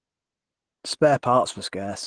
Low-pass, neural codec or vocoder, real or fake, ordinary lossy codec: 9.9 kHz; none; real; Opus, 16 kbps